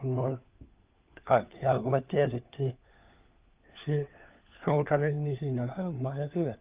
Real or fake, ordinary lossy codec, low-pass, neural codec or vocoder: fake; Opus, 24 kbps; 3.6 kHz; codec, 16 kHz, 4 kbps, FunCodec, trained on LibriTTS, 50 frames a second